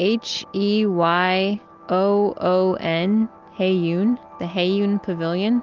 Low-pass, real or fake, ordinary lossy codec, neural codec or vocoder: 7.2 kHz; real; Opus, 32 kbps; none